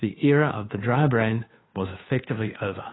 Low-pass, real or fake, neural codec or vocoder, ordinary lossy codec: 7.2 kHz; fake; codec, 16 kHz, 2 kbps, FunCodec, trained on Chinese and English, 25 frames a second; AAC, 16 kbps